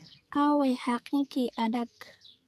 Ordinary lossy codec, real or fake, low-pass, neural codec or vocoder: AAC, 96 kbps; fake; 14.4 kHz; codec, 44.1 kHz, 2.6 kbps, SNAC